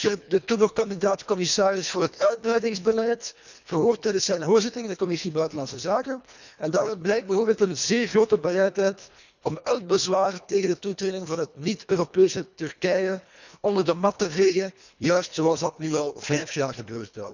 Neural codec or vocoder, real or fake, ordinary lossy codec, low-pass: codec, 24 kHz, 1.5 kbps, HILCodec; fake; none; 7.2 kHz